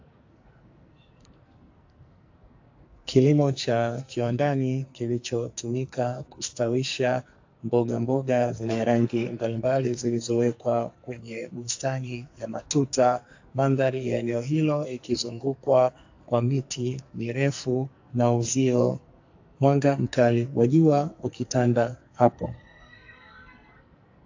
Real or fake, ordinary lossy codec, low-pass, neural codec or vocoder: fake; AAC, 48 kbps; 7.2 kHz; codec, 44.1 kHz, 2.6 kbps, SNAC